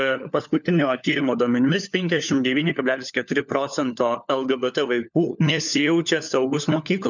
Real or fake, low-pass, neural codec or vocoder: fake; 7.2 kHz; codec, 16 kHz, 4 kbps, FunCodec, trained on LibriTTS, 50 frames a second